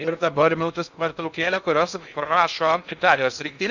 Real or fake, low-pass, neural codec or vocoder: fake; 7.2 kHz; codec, 16 kHz in and 24 kHz out, 0.6 kbps, FocalCodec, streaming, 2048 codes